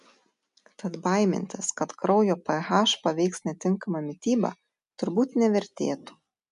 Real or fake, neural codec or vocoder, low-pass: real; none; 10.8 kHz